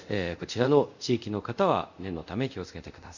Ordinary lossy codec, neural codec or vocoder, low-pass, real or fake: none; codec, 24 kHz, 0.5 kbps, DualCodec; 7.2 kHz; fake